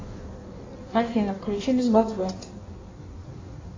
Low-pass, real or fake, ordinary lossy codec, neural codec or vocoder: 7.2 kHz; fake; AAC, 32 kbps; codec, 16 kHz in and 24 kHz out, 1.1 kbps, FireRedTTS-2 codec